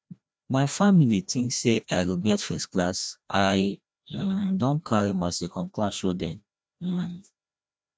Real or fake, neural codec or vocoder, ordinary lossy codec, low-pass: fake; codec, 16 kHz, 1 kbps, FreqCodec, larger model; none; none